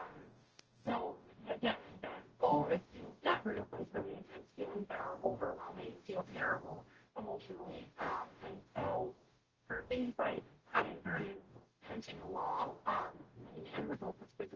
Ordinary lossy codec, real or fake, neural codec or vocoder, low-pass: Opus, 16 kbps; fake; codec, 44.1 kHz, 0.9 kbps, DAC; 7.2 kHz